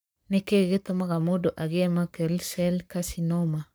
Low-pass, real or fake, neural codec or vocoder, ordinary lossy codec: none; fake; codec, 44.1 kHz, 7.8 kbps, Pupu-Codec; none